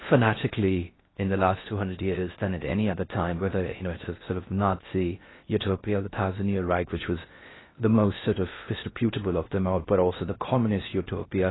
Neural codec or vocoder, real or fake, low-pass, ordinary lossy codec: codec, 16 kHz in and 24 kHz out, 0.6 kbps, FocalCodec, streaming, 4096 codes; fake; 7.2 kHz; AAC, 16 kbps